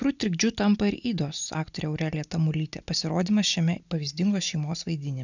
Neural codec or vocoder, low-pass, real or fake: none; 7.2 kHz; real